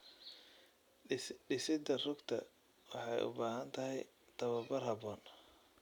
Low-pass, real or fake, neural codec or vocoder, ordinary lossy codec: 19.8 kHz; real; none; none